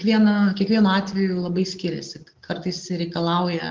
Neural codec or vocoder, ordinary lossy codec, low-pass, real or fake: none; Opus, 16 kbps; 7.2 kHz; real